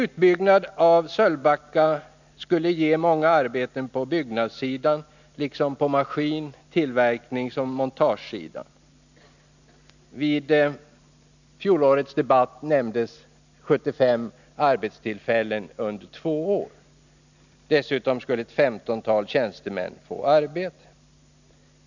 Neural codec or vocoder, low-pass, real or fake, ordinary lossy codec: none; 7.2 kHz; real; none